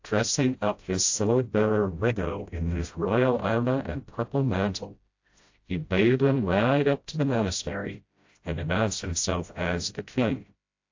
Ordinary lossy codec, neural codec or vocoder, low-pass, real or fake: AAC, 48 kbps; codec, 16 kHz, 0.5 kbps, FreqCodec, smaller model; 7.2 kHz; fake